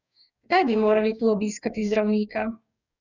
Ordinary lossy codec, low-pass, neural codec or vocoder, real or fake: none; 7.2 kHz; codec, 44.1 kHz, 2.6 kbps, DAC; fake